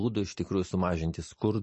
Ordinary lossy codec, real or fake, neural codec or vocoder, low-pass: MP3, 32 kbps; real; none; 10.8 kHz